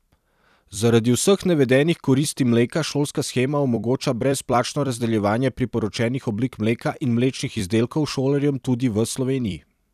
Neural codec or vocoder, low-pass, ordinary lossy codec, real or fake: vocoder, 44.1 kHz, 128 mel bands every 256 samples, BigVGAN v2; 14.4 kHz; none; fake